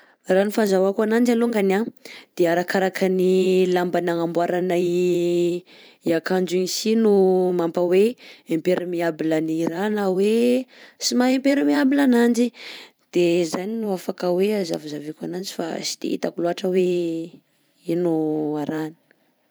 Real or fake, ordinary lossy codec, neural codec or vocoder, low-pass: fake; none; vocoder, 44.1 kHz, 128 mel bands every 512 samples, BigVGAN v2; none